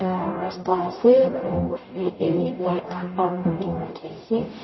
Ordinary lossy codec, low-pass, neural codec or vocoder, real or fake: MP3, 24 kbps; 7.2 kHz; codec, 44.1 kHz, 0.9 kbps, DAC; fake